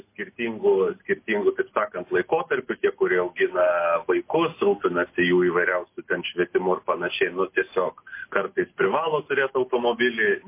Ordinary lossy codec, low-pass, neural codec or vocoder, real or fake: MP3, 24 kbps; 3.6 kHz; none; real